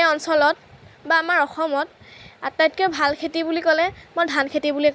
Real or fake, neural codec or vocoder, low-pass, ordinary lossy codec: real; none; none; none